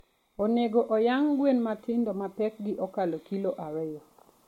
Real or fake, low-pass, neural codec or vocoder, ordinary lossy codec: real; 19.8 kHz; none; MP3, 64 kbps